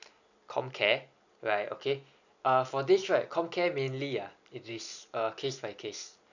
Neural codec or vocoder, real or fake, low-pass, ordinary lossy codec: none; real; 7.2 kHz; none